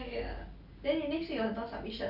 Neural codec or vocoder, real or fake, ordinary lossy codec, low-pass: none; real; none; 5.4 kHz